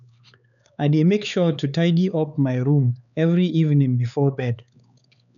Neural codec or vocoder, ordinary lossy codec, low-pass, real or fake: codec, 16 kHz, 4 kbps, X-Codec, HuBERT features, trained on LibriSpeech; none; 7.2 kHz; fake